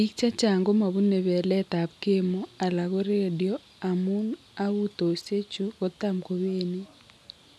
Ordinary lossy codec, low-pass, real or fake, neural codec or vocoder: none; none; real; none